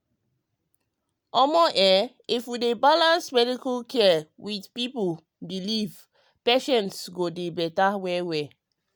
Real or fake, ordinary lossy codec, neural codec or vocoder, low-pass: real; none; none; none